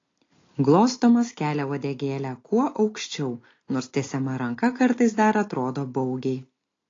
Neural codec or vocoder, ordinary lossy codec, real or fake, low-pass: none; AAC, 32 kbps; real; 7.2 kHz